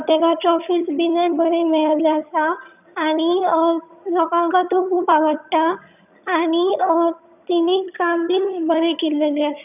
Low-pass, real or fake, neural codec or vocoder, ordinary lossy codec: 3.6 kHz; fake; vocoder, 22.05 kHz, 80 mel bands, HiFi-GAN; none